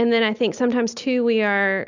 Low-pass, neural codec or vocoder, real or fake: 7.2 kHz; none; real